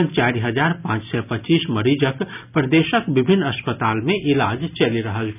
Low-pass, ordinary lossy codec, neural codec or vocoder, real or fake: 3.6 kHz; none; none; real